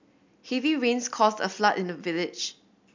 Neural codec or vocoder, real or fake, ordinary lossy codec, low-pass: none; real; MP3, 64 kbps; 7.2 kHz